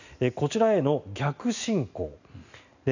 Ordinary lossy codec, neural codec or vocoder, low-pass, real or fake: none; none; 7.2 kHz; real